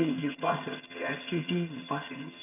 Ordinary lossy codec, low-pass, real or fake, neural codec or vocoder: none; 3.6 kHz; fake; vocoder, 22.05 kHz, 80 mel bands, HiFi-GAN